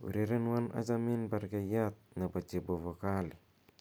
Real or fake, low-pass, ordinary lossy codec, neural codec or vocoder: real; none; none; none